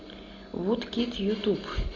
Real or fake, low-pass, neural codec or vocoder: real; 7.2 kHz; none